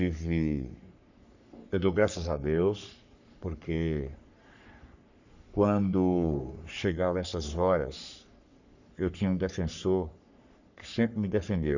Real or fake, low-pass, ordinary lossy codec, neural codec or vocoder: fake; 7.2 kHz; none; codec, 44.1 kHz, 3.4 kbps, Pupu-Codec